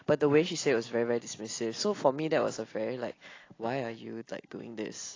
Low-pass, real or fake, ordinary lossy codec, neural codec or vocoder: 7.2 kHz; real; AAC, 32 kbps; none